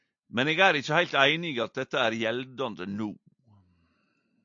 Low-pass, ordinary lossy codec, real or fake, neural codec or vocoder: 7.2 kHz; AAC, 64 kbps; real; none